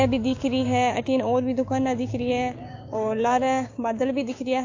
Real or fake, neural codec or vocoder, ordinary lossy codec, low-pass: fake; codec, 16 kHz, 6 kbps, DAC; AAC, 48 kbps; 7.2 kHz